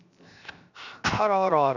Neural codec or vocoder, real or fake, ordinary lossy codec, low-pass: codec, 16 kHz, 0.7 kbps, FocalCodec; fake; none; 7.2 kHz